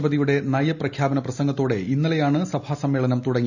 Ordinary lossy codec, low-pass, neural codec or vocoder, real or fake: none; 7.2 kHz; none; real